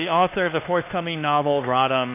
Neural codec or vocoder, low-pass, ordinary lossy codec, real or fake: codec, 16 kHz, 2 kbps, X-Codec, HuBERT features, trained on LibriSpeech; 3.6 kHz; MP3, 24 kbps; fake